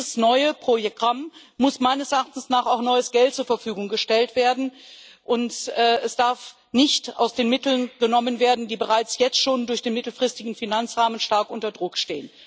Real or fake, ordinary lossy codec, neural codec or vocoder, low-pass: real; none; none; none